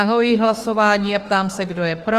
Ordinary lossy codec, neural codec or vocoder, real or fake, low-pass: Opus, 24 kbps; autoencoder, 48 kHz, 32 numbers a frame, DAC-VAE, trained on Japanese speech; fake; 14.4 kHz